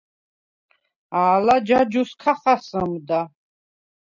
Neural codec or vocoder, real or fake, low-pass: none; real; 7.2 kHz